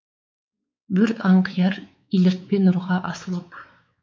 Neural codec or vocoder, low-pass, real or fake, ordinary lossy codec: codec, 16 kHz, 4 kbps, X-Codec, WavLM features, trained on Multilingual LibriSpeech; none; fake; none